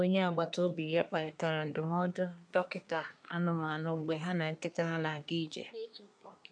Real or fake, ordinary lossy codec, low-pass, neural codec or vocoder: fake; none; 9.9 kHz; codec, 24 kHz, 1 kbps, SNAC